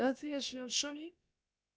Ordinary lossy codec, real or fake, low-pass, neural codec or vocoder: none; fake; none; codec, 16 kHz, about 1 kbps, DyCAST, with the encoder's durations